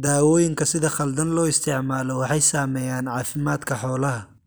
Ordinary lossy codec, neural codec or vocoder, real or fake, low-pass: none; none; real; none